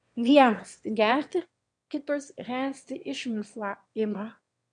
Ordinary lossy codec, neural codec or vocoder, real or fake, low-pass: MP3, 96 kbps; autoencoder, 22.05 kHz, a latent of 192 numbers a frame, VITS, trained on one speaker; fake; 9.9 kHz